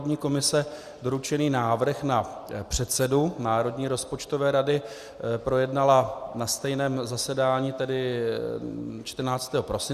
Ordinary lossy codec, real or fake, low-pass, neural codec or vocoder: Opus, 64 kbps; real; 14.4 kHz; none